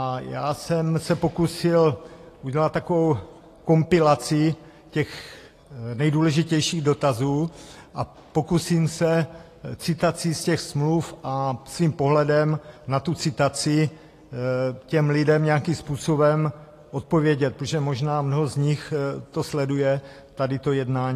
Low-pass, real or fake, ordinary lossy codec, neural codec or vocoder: 14.4 kHz; real; AAC, 48 kbps; none